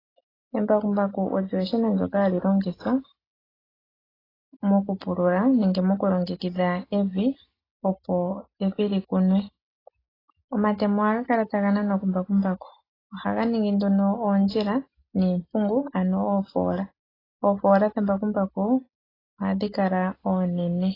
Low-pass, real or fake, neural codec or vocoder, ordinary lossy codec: 5.4 kHz; real; none; AAC, 24 kbps